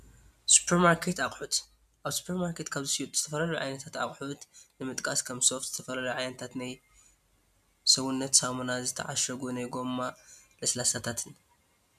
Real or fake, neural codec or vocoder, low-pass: real; none; 14.4 kHz